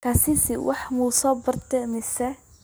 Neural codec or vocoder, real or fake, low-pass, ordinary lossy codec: none; real; none; none